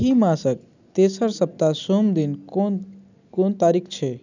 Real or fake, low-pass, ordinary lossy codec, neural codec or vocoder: real; 7.2 kHz; none; none